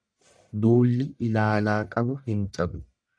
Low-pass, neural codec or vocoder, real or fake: 9.9 kHz; codec, 44.1 kHz, 1.7 kbps, Pupu-Codec; fake